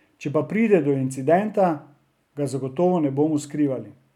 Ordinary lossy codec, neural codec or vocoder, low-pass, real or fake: none; none; 19.8 kHz; real